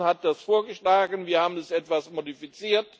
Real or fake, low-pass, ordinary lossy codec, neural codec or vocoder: real; none; none; none